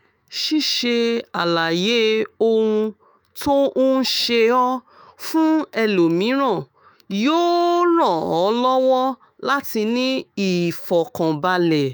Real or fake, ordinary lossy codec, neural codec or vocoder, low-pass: fake; none; autoencoder, 48 kHz, 128 numbers a frame, DAC-VAE, trained on Japanese speech; none